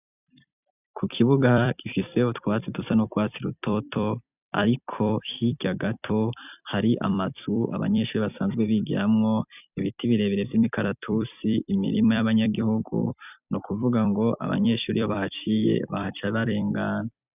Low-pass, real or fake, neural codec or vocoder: 3.6 kHz; fake; vocoder, 44.1 kHz, 128 mel bands every 256 samples, BigVGAN v2